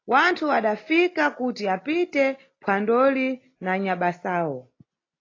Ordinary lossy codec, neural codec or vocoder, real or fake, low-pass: AAC, 32 kbps; none; real; 7.2 kHz